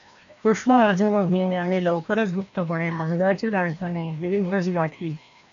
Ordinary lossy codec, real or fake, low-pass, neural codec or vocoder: AAC, 64 kbps; fake; 7.2 kHz; codec, 16 kHz, 1 kbps, FreqCodec, larger model